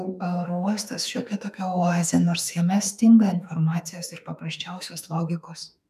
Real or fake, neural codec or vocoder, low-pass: fake; autoencoder, 48 kHz, 32 numbers a frame, DAC-VAE, trained on Japanese speech; 14.4 kHz